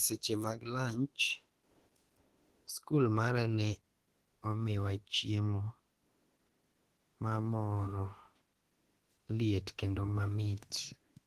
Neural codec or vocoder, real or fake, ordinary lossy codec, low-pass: autoencoder, 48 kHz, 32 numbers a frame, DAC-VAE, trained on Japanese speech; fake; Opus, 16 kbps; 14.4 kHz